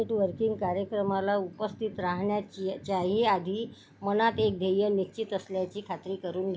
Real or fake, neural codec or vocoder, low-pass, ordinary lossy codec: real; none; none; none